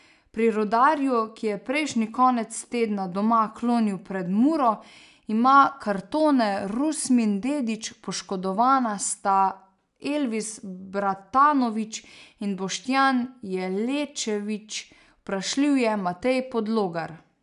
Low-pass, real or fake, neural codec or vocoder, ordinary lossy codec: 10.8 kHz; real; none; none